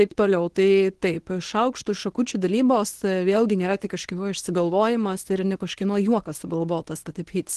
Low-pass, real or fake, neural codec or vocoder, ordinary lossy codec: 10.8 kHz; fake; codec, 24 kHz, 0.9 kbps, WavTokenizer, medium speech release version 1; Opus, 16 kbps